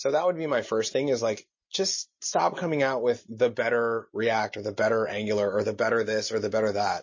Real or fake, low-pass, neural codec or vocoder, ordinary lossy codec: real; 7.2 kHz; none; MP3, 32 kbps